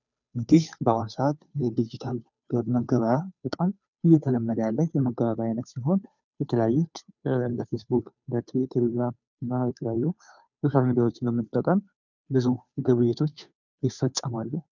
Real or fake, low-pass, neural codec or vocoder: fake; 7.2 kHz; codec, 16 kHz, 2 kbps, FunCodec, trained on Chinese and English, 25 frames a second